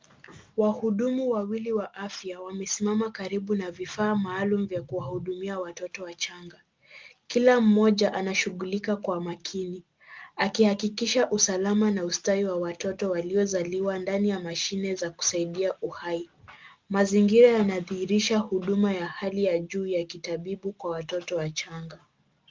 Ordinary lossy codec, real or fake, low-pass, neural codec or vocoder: Opus, 32 kbps; real; 7.2 kHz; none